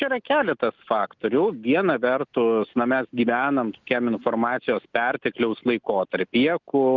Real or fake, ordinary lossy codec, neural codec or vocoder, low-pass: real; Opus, 24 kbps; none; 7.2 kHz